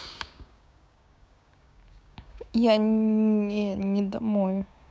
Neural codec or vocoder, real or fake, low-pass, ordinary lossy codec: codec, 16 kHz, 6 kbps, DAC; fake; none; none